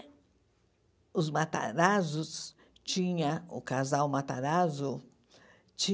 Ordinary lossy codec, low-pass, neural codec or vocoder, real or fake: none; none; none; real